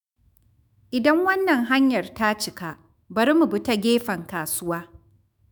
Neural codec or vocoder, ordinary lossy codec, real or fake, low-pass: autoencoder, 48 kHz, 128 numbers a frame, DAC-VAE, trained on Japanese speech; none; fake; none